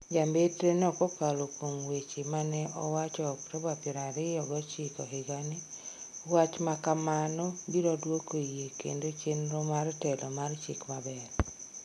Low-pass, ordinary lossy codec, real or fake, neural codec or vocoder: none; none; real; none